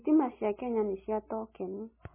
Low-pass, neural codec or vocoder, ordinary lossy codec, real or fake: 3.6 kHz; none; MP3, 16 kbps; real